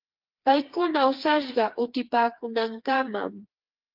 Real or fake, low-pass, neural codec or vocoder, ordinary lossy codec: fake; 5.4 kHz; codec, 16 kHz, 4 kbps, FreqCodec, smaller model; Opus, 32 kbps